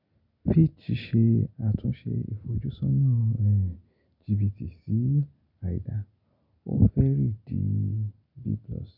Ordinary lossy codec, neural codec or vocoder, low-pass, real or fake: none; none; 5.4 kHz; real